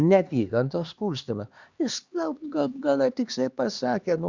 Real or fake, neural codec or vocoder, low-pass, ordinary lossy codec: fake; codec, 16 kHz, 2 kbps, X-Codec, HuBERT features, trained on LibriSpeech; 7.2 kHz; Opus, 64 kbps